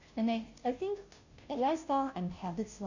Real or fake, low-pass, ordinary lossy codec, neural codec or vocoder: fake; 7.2 kHz; none; codec, 16 kHz, 0.5 kbps, FunCodec, trained on Chinese and English, 25 frames a second